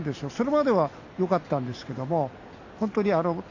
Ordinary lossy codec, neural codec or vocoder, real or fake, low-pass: AAC, 48 kbps; none; real; 7.2 kHz